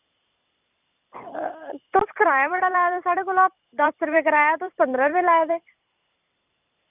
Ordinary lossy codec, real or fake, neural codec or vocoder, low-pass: none; fake; vocoder, 44.1 kHz, 128 mel bands every 256 samples, BigVGAN v2; 3.6 kHz